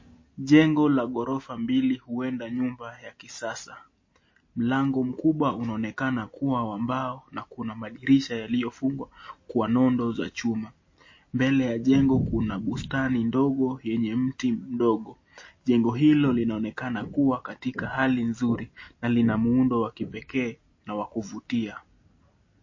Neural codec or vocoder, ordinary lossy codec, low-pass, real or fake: none; MP3, 32 kbps; 7.2 kHz; real